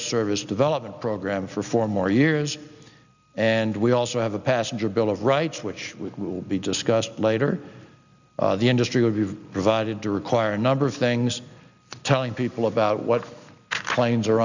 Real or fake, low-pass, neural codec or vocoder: real; 7.2 kHz; none